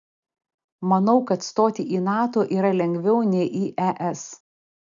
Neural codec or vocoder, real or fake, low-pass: none; real; 7.2 kHz